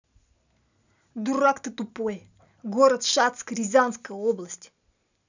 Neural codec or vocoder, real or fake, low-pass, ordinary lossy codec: none; real; 7.2 kHz; none